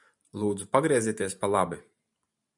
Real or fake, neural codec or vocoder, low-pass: fake; vocoder, 44.1 kHz, 128 mel bands every 512 samples, BigVGAN v2; 10.8 kHz